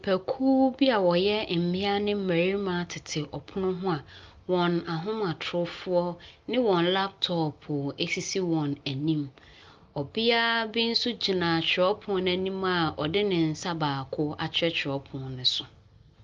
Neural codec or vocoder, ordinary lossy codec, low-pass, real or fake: none; Opus, 24 kbps; 7.2 kHz; real